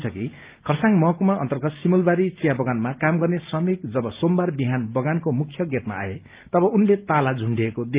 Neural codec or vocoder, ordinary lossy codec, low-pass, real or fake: none; Opus, 32 kbps; 3.6 kHz; real